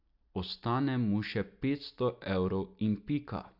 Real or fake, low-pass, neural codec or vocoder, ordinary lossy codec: real; 5.4 kHz; none; AAC, 48 kbps